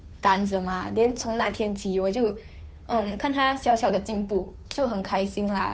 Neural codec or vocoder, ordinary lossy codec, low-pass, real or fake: codec, 16 kHz, 2 kbps, FunCodec, trained on Chinese and English, 25 frames a second; none; none; fake